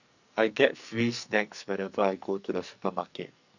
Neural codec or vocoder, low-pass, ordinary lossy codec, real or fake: codec, 44.1 kHz, 2.6 kbps, SNAC; 7.2 kHz; none; fake